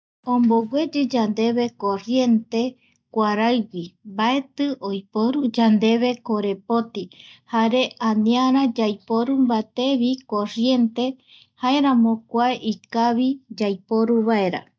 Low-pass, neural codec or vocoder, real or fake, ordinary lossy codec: none; none; real; none